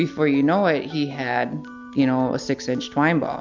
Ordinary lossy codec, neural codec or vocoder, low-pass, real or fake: MP3, 64 kbps; none; 7.2 kHz; real